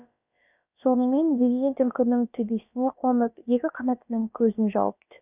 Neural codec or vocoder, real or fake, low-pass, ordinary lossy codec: codec, 16 kHz, about 1 kbps, DyCAST, with the encoder's durations; fake; 3.6 kHz; none